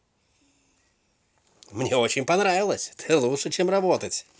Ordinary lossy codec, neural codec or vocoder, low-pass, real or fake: none; none; none; real